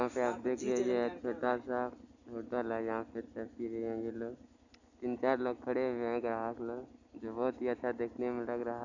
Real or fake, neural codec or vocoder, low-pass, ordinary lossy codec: real; none; 7.2 kHz; none